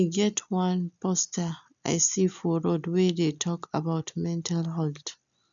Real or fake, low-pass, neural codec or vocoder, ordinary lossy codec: real; 7.2 kHz; none; none